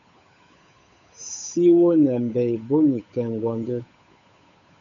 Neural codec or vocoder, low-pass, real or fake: codec, 16 kHz, 8 kbps, FunCodec, trained on Chinese and English, 25 frames a second; 7.2 kHz; fake